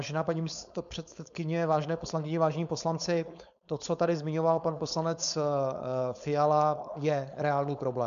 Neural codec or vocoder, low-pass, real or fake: codec, 16 kHz, 4.8 kbps, FACodec; 7.2 kHz; fake